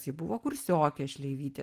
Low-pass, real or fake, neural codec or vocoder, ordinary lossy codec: 14.4 kHz; real; none; Opus, 24 kbps